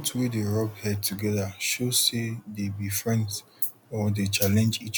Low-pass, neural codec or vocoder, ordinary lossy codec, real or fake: none; none; none; real